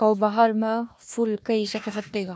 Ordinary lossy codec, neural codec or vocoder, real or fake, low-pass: none; codec, 16 kHz, 1 kbps, FunCodec, trained on Chinese and English, 50 frames a second; fake; none